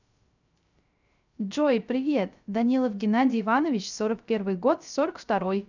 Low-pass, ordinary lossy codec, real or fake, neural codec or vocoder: 7.2 kHz; none; fake; codec, 16 kHz, 0.3 kbps, FocalCodec